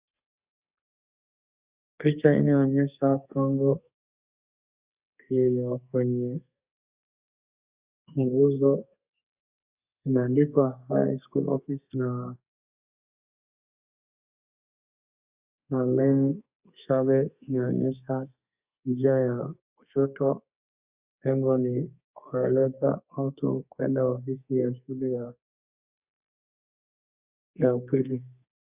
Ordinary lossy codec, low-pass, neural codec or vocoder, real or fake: Opus, 64 kbps; 3.6 kHz; codec, 32 kHz, 1.9 kbps, SNAC; fake